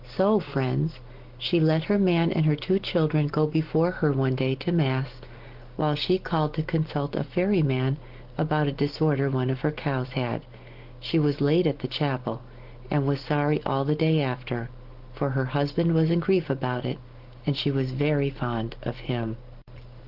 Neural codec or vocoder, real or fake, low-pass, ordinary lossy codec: none; real; 5.4 kHz; Opus, 16 kbps